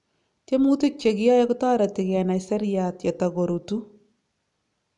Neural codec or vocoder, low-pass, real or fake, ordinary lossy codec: none; 10.8 kHz; real; none